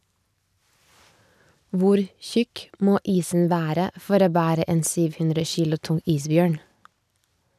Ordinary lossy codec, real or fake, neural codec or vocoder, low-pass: none; real; none; 14.4 kHz